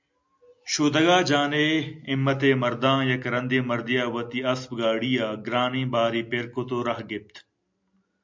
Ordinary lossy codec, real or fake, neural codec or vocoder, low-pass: MP3, 64 kbps; real; none; 7.2 kHz